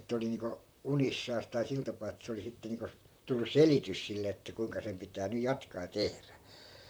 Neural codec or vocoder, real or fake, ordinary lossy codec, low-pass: vocoder, 44.1 kHz, 128 mel bands, Pupu-Vocoder; fake; none; none